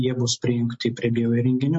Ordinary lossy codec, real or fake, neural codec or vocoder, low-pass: MP3, 32 kbps; real; none; 10.8 kHz